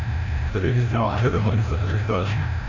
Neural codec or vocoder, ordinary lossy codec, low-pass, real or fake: codec, 16 kHz, 0.5 kbps, FreqCodec, larger model; none; 7.2 kHz; fake